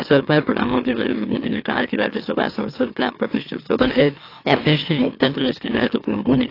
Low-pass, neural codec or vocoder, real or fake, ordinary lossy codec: 5.4 kHz; autoencoder, 44.1 kHz, a latent of 192 numbers a frame, MeloTTS; fake; AAC, 32 kbps